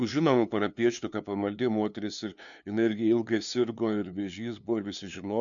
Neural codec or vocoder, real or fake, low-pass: codec, 16 kHz, 2 kbps, FunCodec, trained on LibriTTS, 25 frames a second; fake; 7.2 kHz